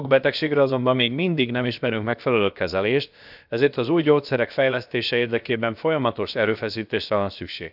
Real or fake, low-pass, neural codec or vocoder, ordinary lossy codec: fake; 5.4 kHz; codec, 16 kHz, about 1 kbps, DyCAST, with the encoder's durations; none